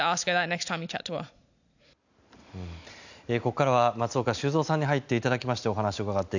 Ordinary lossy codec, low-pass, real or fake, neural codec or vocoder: none; 7.2 kHz; real; none